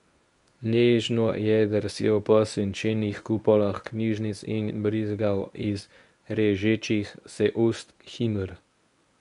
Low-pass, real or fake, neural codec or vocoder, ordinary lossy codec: 10.8 kHz; fake; codec, 24 kHz, 0.9 kbps, WavTokenizer, medium speech release version 1; none